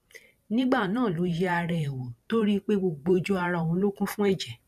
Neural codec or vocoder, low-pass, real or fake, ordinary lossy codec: vocoder, 44.1 kHz, 128 mel bands every 512 samples, BigVGAN v2; 14.4 kHz; fake; none